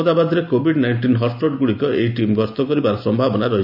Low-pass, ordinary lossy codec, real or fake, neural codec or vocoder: 5.4 kHz; AAC, 48 kbps; real; none